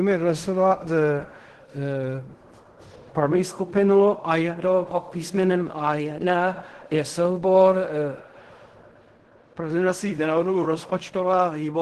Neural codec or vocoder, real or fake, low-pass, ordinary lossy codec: codec, 16 kHz in and 24 kHz out, 0.4 kbps, LongCat-Audio-Codec, fine tuned four codebook decoder; fake; 10.8 kHz; Opus, 16 kbps